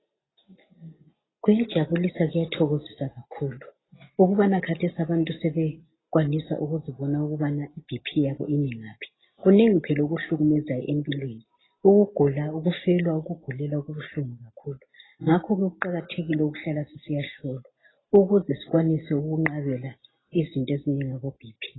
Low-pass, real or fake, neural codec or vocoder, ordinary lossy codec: 7.2 kHz; real; none; AAC, 16 kbps